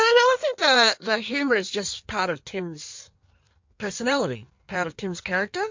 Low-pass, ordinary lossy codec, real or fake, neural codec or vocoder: 7.2 kHz; MP3, 48 kbps; fake; codec, 16 kHz in and 24 kHz out, 1.1 kbps, FireRedTTS-2 codec